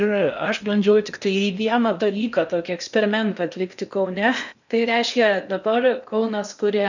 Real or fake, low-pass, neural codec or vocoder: fake; 7.2 kHz; codec, 16 kHz in and 24 kHz out, 0.8 kbps, FocalCodec, streaming, 65536 codes